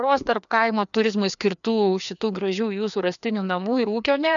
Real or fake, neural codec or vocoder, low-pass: fake; codec, 16 kHz, 2 kbps, FreqCodec, larger model; 7.2 kHz